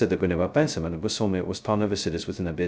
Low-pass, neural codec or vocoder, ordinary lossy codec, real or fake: none; codec, 16 kHz, 0.2 kbps, FocalCodec; none; fake